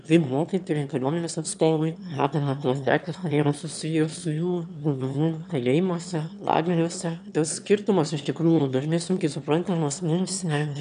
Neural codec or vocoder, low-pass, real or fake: autoencoder, 22.05 kHz, a latent of 192 numbers a frame, VITS, trained on one speaker; 9.9 kHz; fake